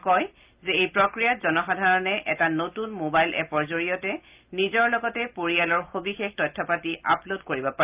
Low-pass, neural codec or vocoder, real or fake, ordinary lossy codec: 3.6 kHz; none; real; Opus, 24 kbps